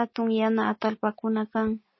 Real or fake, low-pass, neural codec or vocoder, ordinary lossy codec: real; 7.2 kHz; none; MP3, 24 kbps